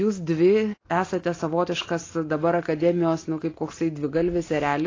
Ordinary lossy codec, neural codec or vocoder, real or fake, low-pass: AAC, 32 kbps; none; real; 7.2 kHz